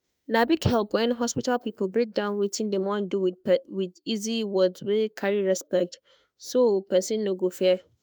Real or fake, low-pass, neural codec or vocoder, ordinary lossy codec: fake; none; autoencoder, 48 kHz, 32 numbers a frame, DAC-VAE, trained on Japanese speech; none